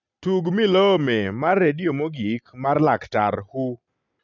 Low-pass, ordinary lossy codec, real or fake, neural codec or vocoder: 7.2 kHz; none; real; none